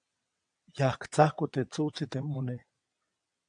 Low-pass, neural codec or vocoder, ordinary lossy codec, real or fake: 9.9 kHz; vocoder, 22.05 kHz, 80 mel bands, WaveNeXt; AAC, 64 kbps; fake